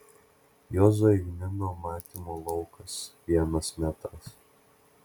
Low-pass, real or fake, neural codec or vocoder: 19.8 kHz; real; none